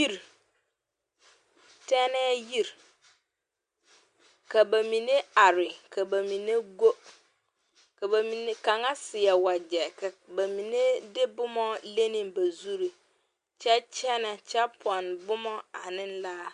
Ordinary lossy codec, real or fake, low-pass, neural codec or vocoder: Opus, 64 kbps; real; 9.9 kHz; none